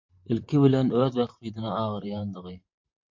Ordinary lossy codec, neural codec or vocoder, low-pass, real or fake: MP3, 48 kbps; vocoder, 44.1 kHz, 128 mel bands, Pupu-Vocoder; 7.2 kHz; fake